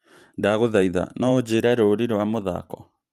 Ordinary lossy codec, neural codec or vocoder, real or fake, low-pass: Opus, 32 kbps; vocoder, 44.1 kHz, 128 mel bands every 512 samples, BigVGAN v2; fake; 14.4 kHz